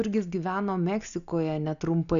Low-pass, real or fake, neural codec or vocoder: 7.2 kHz; real; none